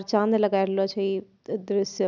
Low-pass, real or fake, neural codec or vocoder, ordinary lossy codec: 7.2 kHz; real; none; none